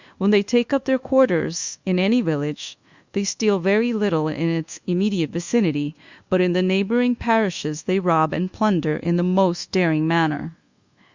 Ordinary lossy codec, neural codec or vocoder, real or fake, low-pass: Opus, 64 kbps; codec, 24 kHz, 1.2 kbps, DualCodec; fake; 7.2 kHz